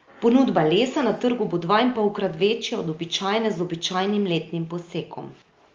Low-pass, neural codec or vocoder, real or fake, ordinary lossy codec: 7.2 kHz; none; real; Opus, 32 kbps